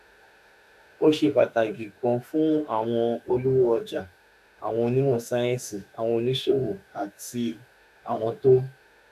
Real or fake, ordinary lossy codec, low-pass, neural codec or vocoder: fake; none; 14.4 kHz; autoencoder, 48 kHz, 32 numbers a frame, DAC-VAE, trained on Japanese speech